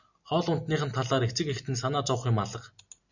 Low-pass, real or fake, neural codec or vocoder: 7.2 kHz; real; none